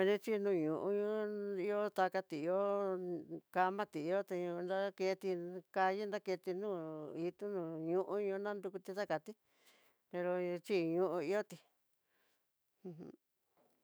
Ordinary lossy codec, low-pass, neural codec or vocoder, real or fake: none; none; autoencoder, 48 kHz, 128 numbers a frame, DAC-VAE, trained on Japanese speech; fake